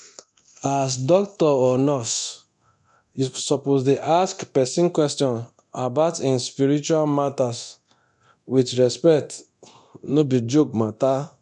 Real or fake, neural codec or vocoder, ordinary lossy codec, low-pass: fake; codec, 24 kHz, 0.9 kbps, DualCodec; none; 10.8 kHz